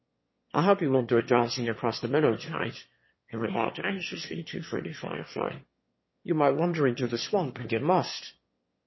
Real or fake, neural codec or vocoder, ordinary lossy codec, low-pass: fake; autoencoder, 22.05 kHz, a latent of 192 numbers a frame, VITS, trained on one speaker; MP3, 24 kbps; 7.2 kHz